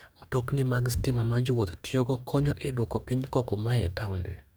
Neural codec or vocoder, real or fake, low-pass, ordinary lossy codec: codec, 44.1 kHz, 2.6 kbps, DAC; fake; none; none